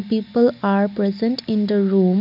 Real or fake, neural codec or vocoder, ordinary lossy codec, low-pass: real; none; none; 5.4 kHz